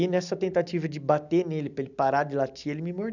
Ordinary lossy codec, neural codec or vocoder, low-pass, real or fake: none; none; 7.2 kHz; real